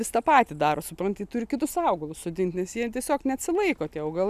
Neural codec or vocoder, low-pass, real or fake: none; 14.4 kHz; real